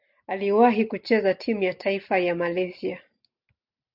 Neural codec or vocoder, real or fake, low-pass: none; real; 5.4 kHz